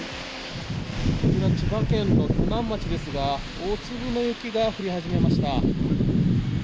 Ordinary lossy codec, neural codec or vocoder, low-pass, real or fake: none; none; none; real